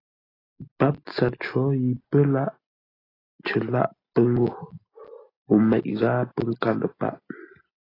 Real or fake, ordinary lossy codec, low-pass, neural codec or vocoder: real; AAC, 24 kbps; 5.4 kHz; none